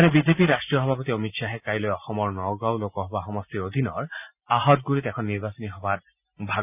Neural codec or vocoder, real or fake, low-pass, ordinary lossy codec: none; real; 3.6 kHz; none